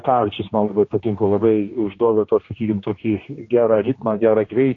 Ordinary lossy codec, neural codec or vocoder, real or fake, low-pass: AAC, 32 kbps; codec, 16 kHz, 2 kbps, X-Codec, HuBERT features, trained on general audio; fake; 7.2 kHz